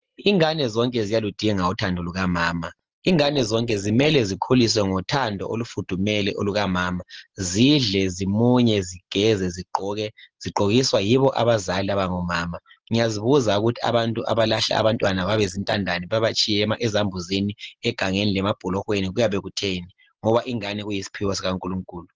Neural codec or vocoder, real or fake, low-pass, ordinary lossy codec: none; real; 7.2 kHz; Opus, 16 kbps